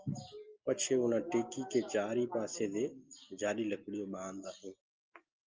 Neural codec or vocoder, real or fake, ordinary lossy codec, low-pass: none; real; Opus, 24 kbps; 7.2 kHz